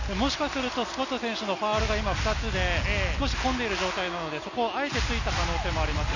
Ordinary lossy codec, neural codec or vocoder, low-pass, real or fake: none; none; 7.2 kHz; real